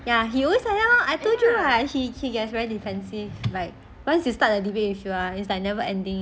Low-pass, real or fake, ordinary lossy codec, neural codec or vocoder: none; real; none; none